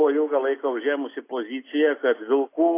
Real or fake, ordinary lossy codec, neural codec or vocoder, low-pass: real; AAC, 24 kbps; none; 3.6 kHz